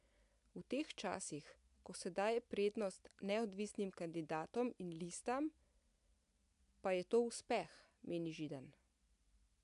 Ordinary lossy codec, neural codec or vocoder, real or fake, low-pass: AAC, 96 kbps; none; real; 10.8 kHz